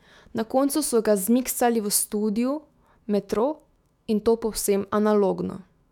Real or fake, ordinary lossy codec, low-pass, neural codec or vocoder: fake; none; 19.8 kHz; autoencoder, 48 kHz, 128 numbers a frame, DAC-VAE, trained on Japanese speech